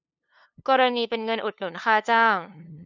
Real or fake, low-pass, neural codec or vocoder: fake; 7.2 kHz; codec, 16 kHz, 2 kbps, FunCodec, trained on LibriTTS, 25 frames a second